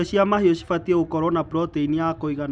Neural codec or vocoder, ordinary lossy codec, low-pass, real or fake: none; none; 9.9 kHz; real